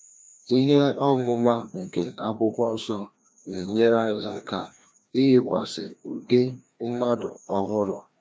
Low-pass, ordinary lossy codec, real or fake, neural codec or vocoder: none; none; fake; codec, 16 kHz, 1 kbps, FreqCodec, larger model